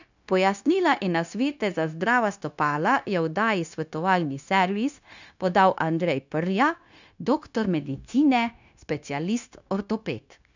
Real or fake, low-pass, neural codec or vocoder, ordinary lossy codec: fake; 7.2 kHz; codec, 16 kHz, 0.9 kbps, LongCat-Audio-Codec; none